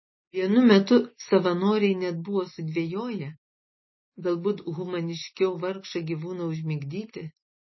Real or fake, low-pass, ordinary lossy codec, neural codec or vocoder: real; 7.2 kHz; MP3, 24 kbps; none